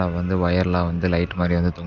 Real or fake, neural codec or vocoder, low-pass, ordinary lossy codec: real; none; 7.2 kHz; Opus, 32 kbps